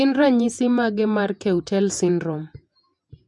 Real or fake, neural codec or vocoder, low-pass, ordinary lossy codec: fake; vocoder, 48 kHz, 128 mel bands, Vocos; 10.8 kHz; none